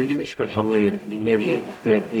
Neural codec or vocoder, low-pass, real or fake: codec, 44.1 kHz, 0.9 kbps, DAC; 19.8 kHz; fake